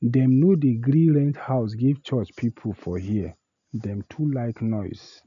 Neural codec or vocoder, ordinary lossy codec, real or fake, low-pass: none; none; real; 7.2 kHz